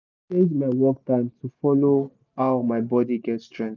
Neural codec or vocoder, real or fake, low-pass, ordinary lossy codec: none; real; 7.2 kHz; none